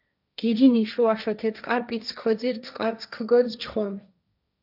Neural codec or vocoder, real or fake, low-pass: codec, 16 kHz, 1.1 kbps, Voila-Tokenizer; fake; 5.4 kHz